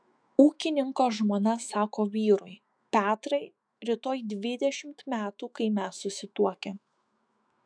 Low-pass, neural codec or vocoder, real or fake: 9.9 kHz; autoencoder, 48 kHz, 128 numbers a frame, DAC-VAE, trained on Japanese speech; fake